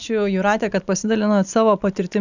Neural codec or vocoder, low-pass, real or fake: none; 7.2 kHz; real